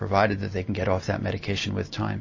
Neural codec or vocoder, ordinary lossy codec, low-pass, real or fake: none; MP3, 32 kbps; 7.2 kHz; real